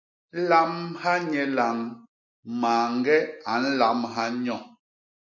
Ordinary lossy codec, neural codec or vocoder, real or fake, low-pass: MP3, 48 kbps; none; real; 7.2 kHz